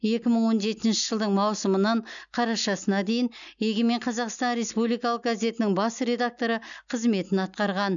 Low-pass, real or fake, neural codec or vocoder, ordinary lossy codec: 7.2 kHz; real; none; none